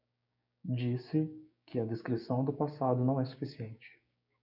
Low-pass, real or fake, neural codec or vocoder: 5.4 kHz; fake; codec, 16 kHz, 6 kbps, DAC